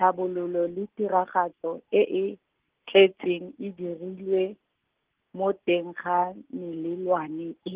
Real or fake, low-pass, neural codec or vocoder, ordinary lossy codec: real; 3.6 kHz; none; Opus, 16 kbps